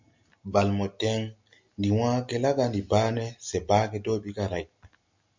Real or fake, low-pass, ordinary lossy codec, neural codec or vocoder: real; 7.2 kHz; MP3, 64 kbps; none